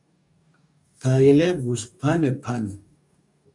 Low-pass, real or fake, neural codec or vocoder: 10.8 kHz; fake; codec, 44.1 kHz, 2.6 kbps, DAC